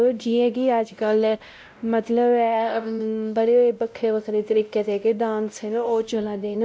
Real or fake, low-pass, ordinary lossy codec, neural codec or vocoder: fake; none; none; codec, 16 kHz, 0.5 kbps, X-Codec, WavLM features, trained on Multilingual LibriSpeech